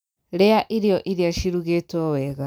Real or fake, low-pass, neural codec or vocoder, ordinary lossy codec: real; none; none; none